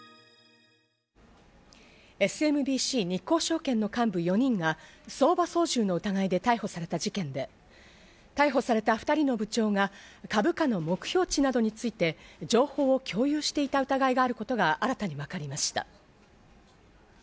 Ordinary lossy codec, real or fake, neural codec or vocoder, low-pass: none; real; none; none